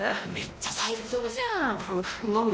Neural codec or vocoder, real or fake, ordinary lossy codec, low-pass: codec, 16 kHz, 1 kbps, X-Codec, WavLM features, trained on Multilingual LibriSpeech; fake; none; none